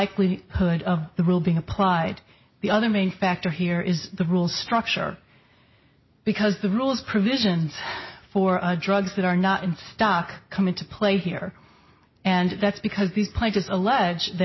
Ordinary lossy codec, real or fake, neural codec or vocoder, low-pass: MP3, 24 kbps; real; none; 7.2 kHz